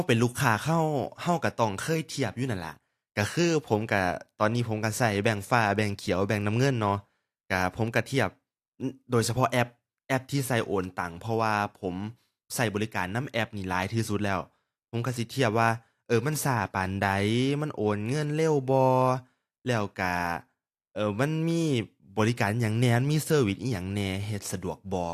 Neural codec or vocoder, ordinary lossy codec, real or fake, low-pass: none; AAC, 64 kbps; real; 14.4 kHz